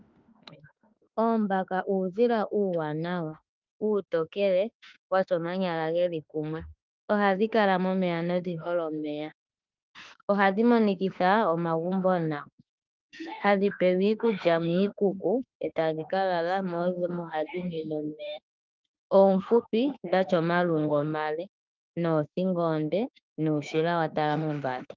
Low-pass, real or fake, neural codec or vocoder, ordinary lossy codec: 7.2 kHz; fake; autoencoder, 48 kHz, 32 numbers a frame, DAC-VAE, trained on Japanese speech; Opus, 24 kbps